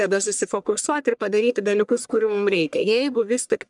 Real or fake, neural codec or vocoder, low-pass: fake; codec, 44.1 kHz, 1.7 kbps, Pupu-Codec; 10.8 kHz